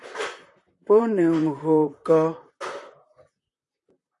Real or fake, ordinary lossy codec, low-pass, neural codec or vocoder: fake; AAC, 48 kbps; 10.8 kHz; vocoder, 44.1 kHz, 128 mel bands, Pupu-Vocoder